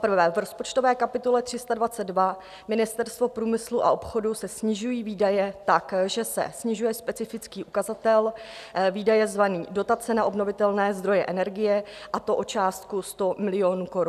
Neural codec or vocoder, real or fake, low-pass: none; real; 14.4 kHz